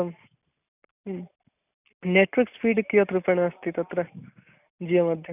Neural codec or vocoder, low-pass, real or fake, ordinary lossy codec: none; 3.6 kHz; real; none